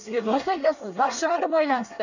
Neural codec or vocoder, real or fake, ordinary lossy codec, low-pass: codec, 24 kHz, 1 kbps, SNAC; fake; none; 7.2 kHz